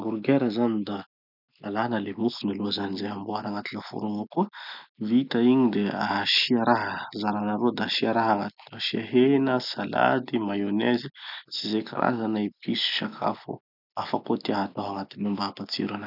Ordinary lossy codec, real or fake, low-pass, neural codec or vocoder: none; real; 5.4 kHz; none